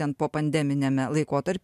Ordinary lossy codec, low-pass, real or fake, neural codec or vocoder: MP3, 96 kbps; 14.4 kHz; real; none